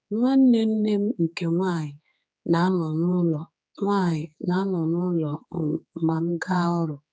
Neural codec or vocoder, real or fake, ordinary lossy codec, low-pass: codec, 16 kHz, 4 kbps, X-Codec, HuBERT features, trained on general audio; fake; none; none